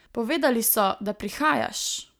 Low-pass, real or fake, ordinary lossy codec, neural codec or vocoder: none; real; none; none